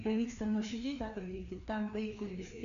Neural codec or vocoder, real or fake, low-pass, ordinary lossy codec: codec, 16 kHz, 2 kbps, FreqCodec, larger model; fake; 7.2 kHz; AAC, 96 kbps